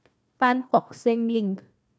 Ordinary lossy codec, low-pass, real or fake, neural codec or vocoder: none; none; fake; codec, 16 kHz, 1 kbps, FunCodec, trained on Chinese and English, 50 frames a second